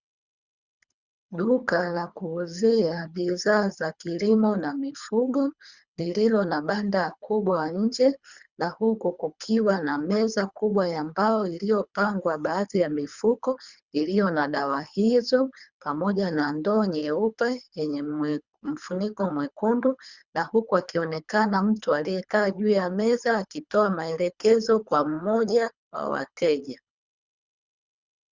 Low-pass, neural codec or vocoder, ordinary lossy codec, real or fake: 7.2 kHz; codec, 24 kHz, 3 kbps, HILCodec; Opus, 64 kbps; fake